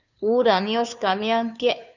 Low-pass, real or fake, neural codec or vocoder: 7.2 kHz; fake; codec, 16 kHz, 2 kbps, FunCodec, trained on Chinese and English, 25 frames a second